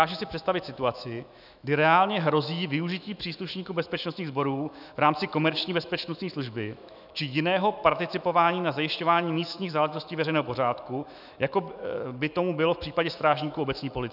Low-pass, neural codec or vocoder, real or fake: 5.4 kHz; autoencoder, 48 kHz, 128 numbers a frame, DAC-VAE, trained on Japanese speech; fake